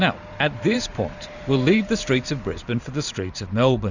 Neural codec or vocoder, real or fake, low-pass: vocoder, 44.1 kHz, 128 mel bands every 512 samples, BigVGAN v2; fake; 7.2 kHz